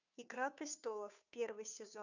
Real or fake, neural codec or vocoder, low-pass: fake; codec, 16 kHz, 2 kbps, FunCodec, trained on Chinese and English, 25 frames a second; 7.2 kHz